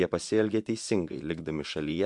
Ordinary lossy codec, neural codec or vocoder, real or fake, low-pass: MP3, 64 kbps; vocoder, 24 kHz, 100 mel bands, Vocos; fake; 10.8 kHz